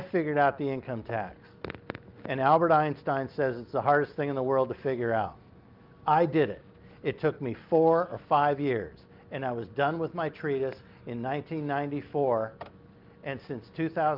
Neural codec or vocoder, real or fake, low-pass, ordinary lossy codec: none; real; 5.4 kHz; Opus, 24 kbps